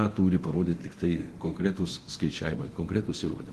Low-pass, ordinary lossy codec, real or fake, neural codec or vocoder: 10.8 kHz; Opus, 16 kbps; fake; codec, 24 kHz, 0.9 kbps, DualCodec